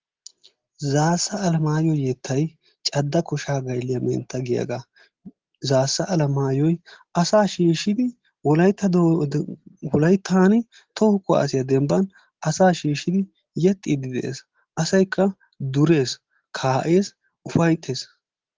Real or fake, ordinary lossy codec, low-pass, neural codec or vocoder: real; Opus, 16 kbps; 7.2 kHz; none